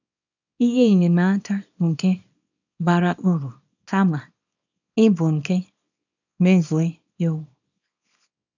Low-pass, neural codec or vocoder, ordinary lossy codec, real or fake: 7.2 kHz; codec, 24 kHz, 0.9 kbps, WavTokenizer, small release; none; fake